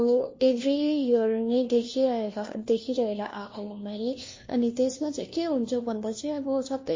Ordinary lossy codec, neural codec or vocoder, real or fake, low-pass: MP3, 32 kbps; codec, 16 kHz, 1 kbps, FunCodec, trained on LibriTTS, 50 frames a second; fake; 7.2 kHz